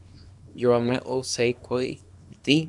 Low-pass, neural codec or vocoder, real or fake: 10.8 kHz; codec, 24 kHz, 0.9 kbps, WavTokenizer, small release; fake